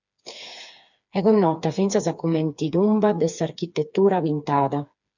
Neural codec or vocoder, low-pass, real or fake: codec, 16 kHz, 4 kbps, FreqCodec, smaller model; 7.2 kHz; fake